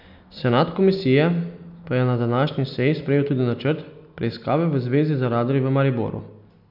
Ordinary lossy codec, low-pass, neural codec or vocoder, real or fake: none; 5.4 kHz; none; real